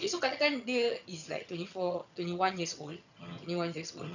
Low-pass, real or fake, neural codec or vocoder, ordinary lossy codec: 7.2 kHz; fake; vocoder, 22.05 kHz, 80 mel bands, HiFi-GAN; none